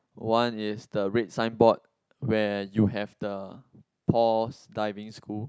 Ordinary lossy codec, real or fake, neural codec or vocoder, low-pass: none; real; none; none